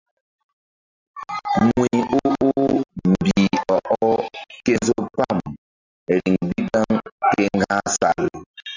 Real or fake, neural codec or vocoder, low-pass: real; none; 7.2 kHz